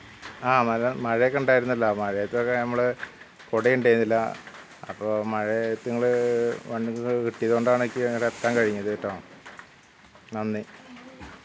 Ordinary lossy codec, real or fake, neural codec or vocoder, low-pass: none; real; none; none